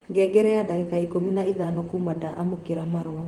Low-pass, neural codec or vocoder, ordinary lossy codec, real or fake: 19.8 kHz; vocoder, 44.1 kHz, 128 mel bands, Pupu-Vocoder; Opus, 24 kbps; fake